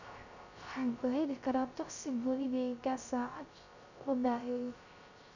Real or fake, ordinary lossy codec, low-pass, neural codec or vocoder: fake; none; 7.2 kHz; codec, 16 kHz, 0.3 kbps, FocalCodec